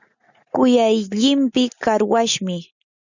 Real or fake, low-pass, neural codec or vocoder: real; 7.2 kHz; none